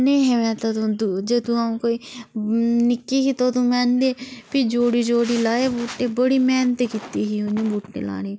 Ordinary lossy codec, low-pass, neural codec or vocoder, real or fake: none; none; none; real